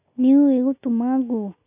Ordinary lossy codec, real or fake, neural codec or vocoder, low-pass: none; real; none; 3.6 kHz